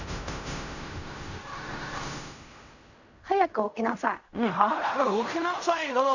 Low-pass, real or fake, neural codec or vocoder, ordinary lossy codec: 7.2 kHz; fake; codec, 16 kHz in and 24 kHz out, 0.4 kbps, LongCat-Audio-Codec, fine tuned four codebook decoder; none